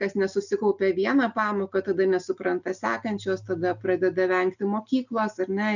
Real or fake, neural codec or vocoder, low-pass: real; none; 7.2 kHz